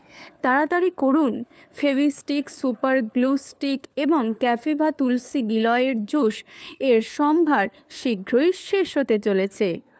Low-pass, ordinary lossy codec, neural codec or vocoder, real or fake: none; none; codec, 16 kHz, 4 kbps, FunCodec, trained on LibriTTS, 50 frames a second; fake